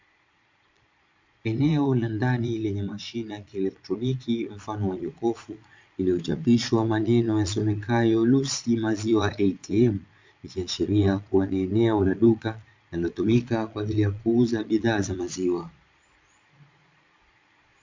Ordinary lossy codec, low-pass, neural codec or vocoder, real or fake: MP3, 64 kbps; 7.2 kHz; vocoder, 22.05 kHz, 80 mel bands, Vocos; fake